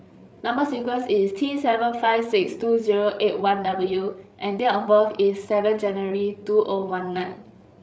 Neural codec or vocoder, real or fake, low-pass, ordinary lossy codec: codec, 16 kHz, 8 kbps, FreqCodec, larger model; fake; none; none